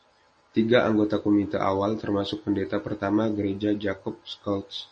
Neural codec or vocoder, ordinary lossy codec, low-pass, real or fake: none; MP3, 32 kbps; 10.8 kHz; real